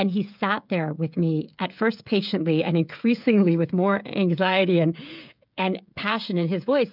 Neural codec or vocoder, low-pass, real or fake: codec, 16 kHz, 16 kbps, FreqCodec, smaller model; 5.4 kHz; fake